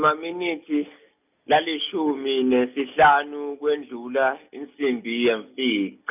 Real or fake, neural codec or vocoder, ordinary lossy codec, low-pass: real; none; none; 3.6 kHz